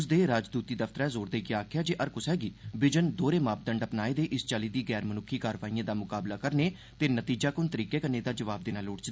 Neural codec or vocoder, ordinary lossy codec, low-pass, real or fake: none; none; none; real